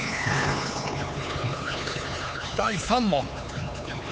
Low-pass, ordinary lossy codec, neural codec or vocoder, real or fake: none; none; codec, 16 kHz, 4 kbps, X-Codec, HuBERT features, trained on LibriSpeech; fake